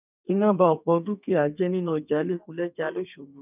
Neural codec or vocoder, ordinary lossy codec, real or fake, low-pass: codec, 32 kHz, 1.9 kbps, SNAC; none; fake; 3.6 kHz